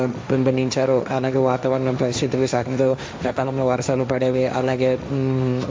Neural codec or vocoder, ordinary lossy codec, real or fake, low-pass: codec, 16 kHz, 1.1 kbps, Voila-Tokenizer; none; fake; none